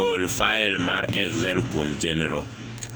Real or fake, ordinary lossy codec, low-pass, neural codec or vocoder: fake; none; none; codec, 44.1 kHz, 2.6 kbps, DAC